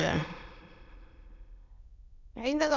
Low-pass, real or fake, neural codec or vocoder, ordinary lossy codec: 7.2 kHz; fake; autoencoder, 22.05 kHz, a latent of 192 numbers a frame, VITS, trained on many speakers; none